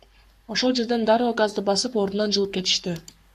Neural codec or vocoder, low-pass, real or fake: codec, 44.1 kHz, 7.8 kbps, Pupu-Codec; 14.4 kHz; fake